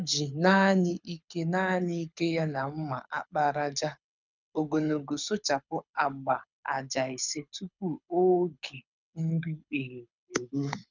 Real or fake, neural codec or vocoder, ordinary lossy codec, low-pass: fake; codec, 24 kHz, 6 kbps, HILCodec; none; 7.2 kHz